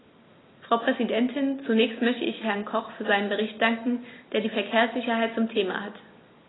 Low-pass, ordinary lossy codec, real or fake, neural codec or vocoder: 7.2 kHz; AAC, 16 kbps; real; none